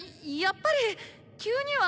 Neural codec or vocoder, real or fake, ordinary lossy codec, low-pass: none; real; none; none